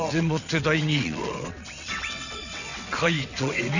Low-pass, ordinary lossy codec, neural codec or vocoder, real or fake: 7.2 kHz; none; vocoder, 22.05 kHz, 80 mel bands, WaveNeXt; fake